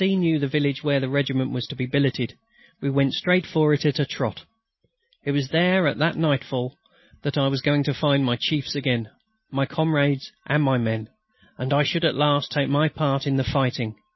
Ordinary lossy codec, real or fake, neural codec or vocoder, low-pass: MP3, 24 kbps; real; none; 7.2 kHz